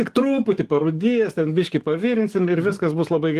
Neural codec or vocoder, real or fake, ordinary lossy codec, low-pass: codec, 44.1 kHz, 7.8 kbps, DAC; fake; Opus, 24 kbps; 14.4 kHz